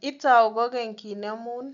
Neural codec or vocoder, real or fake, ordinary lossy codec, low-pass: none; real; none; 7.2 kHz